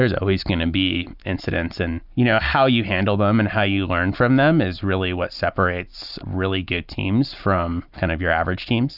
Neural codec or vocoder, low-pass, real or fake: none; 5.4 kHz; real